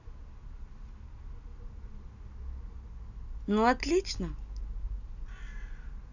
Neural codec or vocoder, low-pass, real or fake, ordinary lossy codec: none; 7.2 kHz; real; none